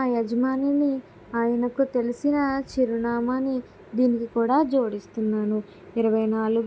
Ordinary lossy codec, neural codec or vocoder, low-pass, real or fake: none; none; none; real